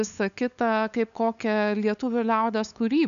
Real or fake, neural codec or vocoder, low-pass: fake; codec, 16 kHz, 8 kbps, FunCodec, trained on LibriTTS, 25 frames a second; 7.2 kHz